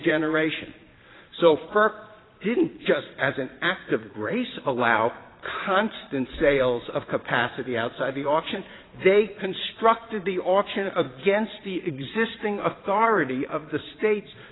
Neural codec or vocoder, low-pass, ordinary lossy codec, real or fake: vocoder, 22.05 kHz, 80 mel bands, Vocos; 7.2 kHz; AAC, 16 kbps; fake